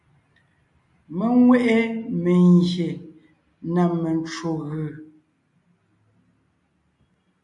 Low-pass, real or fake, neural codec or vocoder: 10.8 kHz; real; none